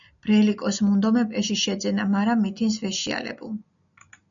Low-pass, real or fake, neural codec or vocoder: 7.2 kHz; real; none